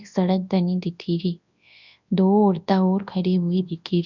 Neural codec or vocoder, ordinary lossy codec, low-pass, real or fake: codec, 24 kHz, 0.9 kbps, WavTokenizer, large speech release; none; 7.2 kHz; fake